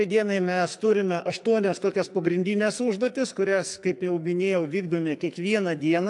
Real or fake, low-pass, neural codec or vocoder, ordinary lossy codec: fake; 10.8 kHz; codec, 44.1 kHz, 2.6 kbps, SNAC; AAC, 64 kbps